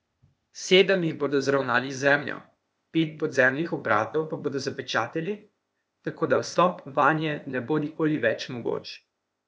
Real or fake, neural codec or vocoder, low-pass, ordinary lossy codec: fake; codec, 16 kHz, 0.8 kbps, ZipCodec; none; none